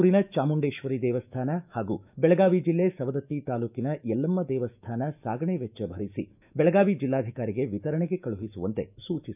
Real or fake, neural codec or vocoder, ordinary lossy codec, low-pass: fake; autoencoder, 48 kHz, 128 numbers a frame, DAC-VAE, trained on Japanese speech; none; 3.6 kHz